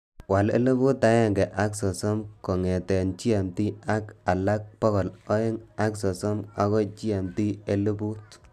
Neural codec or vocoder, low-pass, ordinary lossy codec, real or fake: none; 14.4 kHz; none; real